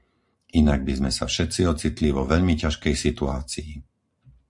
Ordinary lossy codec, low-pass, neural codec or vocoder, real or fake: MP3, 64 kbps; 10.8 kHz; vocoder, 44.1 kHz, 128 mel bands every 512 samples, BigVGAN v2; fake